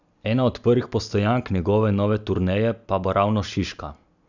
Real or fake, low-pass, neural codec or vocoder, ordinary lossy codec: fake; 7.2 kHz; vocoder, 44.1 kHz, 128 mel bands every 256 samples, BigVGAN v2; none